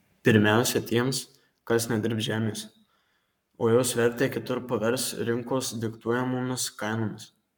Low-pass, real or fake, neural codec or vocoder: 19.8 kHz; fake; codec, 44.1 kHz, 7.8 kbps, Pupu-Codec